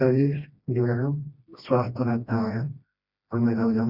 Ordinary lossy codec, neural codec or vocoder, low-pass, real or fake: none; codec, 16 kHz, 1 kbps, FreqCodec, smaller model; 5.4 kHz; fake